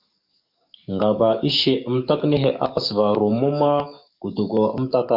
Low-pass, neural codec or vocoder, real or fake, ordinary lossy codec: 5.4 kHz; codec, 44.1 kHz, 7.8 kbps, DAC; fake; MP3, 48 kbps